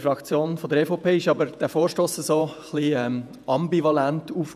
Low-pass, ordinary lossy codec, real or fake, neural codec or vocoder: 14.4 kHz; none; fake; vocoder, 48 kHz, 128 mel bands, Vocos